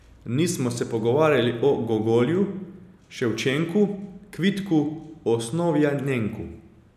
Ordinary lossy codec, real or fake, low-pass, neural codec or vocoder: none; real; 14.4 kHz; none